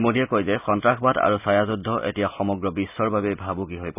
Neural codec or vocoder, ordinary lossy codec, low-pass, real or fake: none; none; 3.6 kHz; real